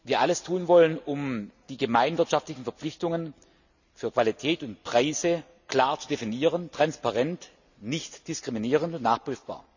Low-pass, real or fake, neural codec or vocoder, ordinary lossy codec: 7.2 kHz; real; none; none